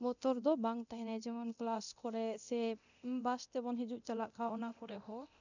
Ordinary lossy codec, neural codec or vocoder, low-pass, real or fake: none; codec, 24 kHz, 0.9 kbps, DualCodec; 7.2 kHz; fake